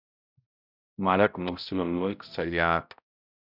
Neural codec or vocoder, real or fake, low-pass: codec, 16 kHz, 0.5 kbps, X-Codec, HuBERT features, trained on balanced general audio; fake; 5.4 kHz